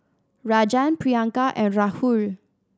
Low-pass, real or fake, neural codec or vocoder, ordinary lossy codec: none; real; none; none